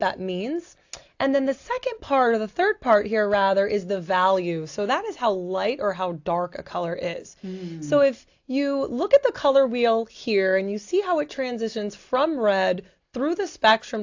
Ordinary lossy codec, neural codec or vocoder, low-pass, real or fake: AAC, 48 kbps; none; 7.2 kHz; real